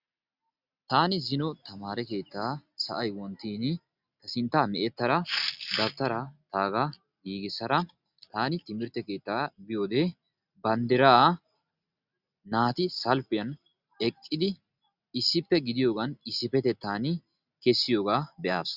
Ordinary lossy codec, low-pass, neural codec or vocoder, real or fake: Opus, 64 kbps; 5.4 kHz; none; real